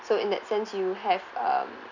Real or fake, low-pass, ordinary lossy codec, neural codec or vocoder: real; 7.2 kHz; none; none